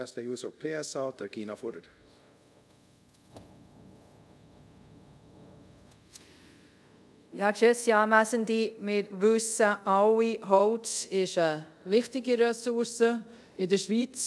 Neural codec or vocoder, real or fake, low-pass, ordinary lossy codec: codec, 24 kHz, 0.5 kbps, DualCodec; fake; none; none